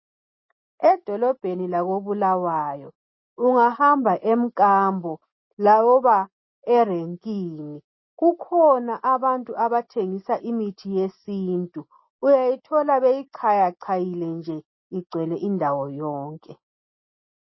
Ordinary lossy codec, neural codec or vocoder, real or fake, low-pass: MP3, 24 kbps; none; real; 7.2 kHz